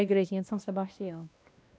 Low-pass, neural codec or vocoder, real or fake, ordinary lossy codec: none; codec, 16 kHz, 1 kbps, X-Codec, WavLM features, trained on Multilingual LibriSpeech; fake; none